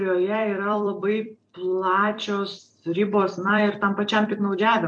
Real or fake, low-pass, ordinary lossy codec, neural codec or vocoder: fake; 9.9 kHz; MP3, 64 kbps; vocoder, 44.1 kHz, 128 mel bands every 256 samples, BigVGAN v2